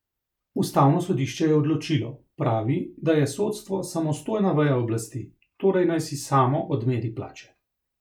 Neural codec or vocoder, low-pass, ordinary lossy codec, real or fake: none; 19.8 kHz; none; real